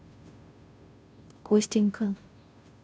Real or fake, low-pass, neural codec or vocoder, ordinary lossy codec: fake; none; codec, 16 kHz, 0.5 kbps, FunCodec, trained on Chinese and English, 25 frames a second; none